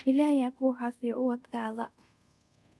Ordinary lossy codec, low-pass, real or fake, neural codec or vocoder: none; none; fake; codec, 24 kHz, 0.5 kbps, DualCodec